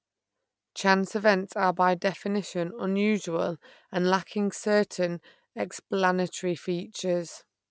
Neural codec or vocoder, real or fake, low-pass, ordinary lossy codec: none; real; none; none